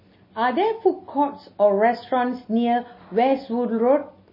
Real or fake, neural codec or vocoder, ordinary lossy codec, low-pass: real; none; MP3, 24 kbps; 5.4 kHz